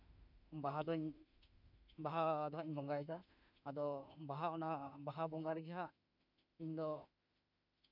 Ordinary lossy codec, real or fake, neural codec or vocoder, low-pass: none; fake; autoencoder, 48 kHz, 32 numbers a frame, DAC-VAE, trained on Japanese speech; 5.4 kHz